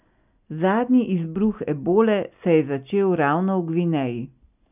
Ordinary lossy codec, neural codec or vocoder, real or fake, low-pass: none; none; real; 3.6 kHz